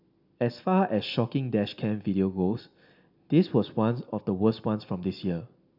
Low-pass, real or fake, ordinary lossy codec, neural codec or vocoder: 5.4 kHz; real; none; none